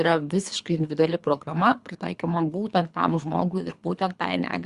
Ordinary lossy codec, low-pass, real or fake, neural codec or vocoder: Opus, 64 kbps; 10.8 kHz; fake; codec, 24 kHz, 3 kbps, HILCodec